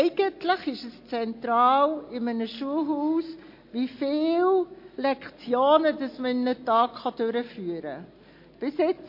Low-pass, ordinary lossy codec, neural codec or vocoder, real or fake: 5.4 kHz; MP3, 32 kbps; none; real